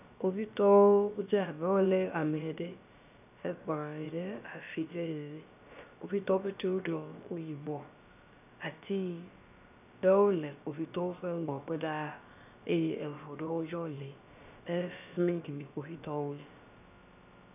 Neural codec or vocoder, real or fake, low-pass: codec, 16 kHz, about 1 kbps, DyCAST, with the encoder's durations; fake; 3.6 kHz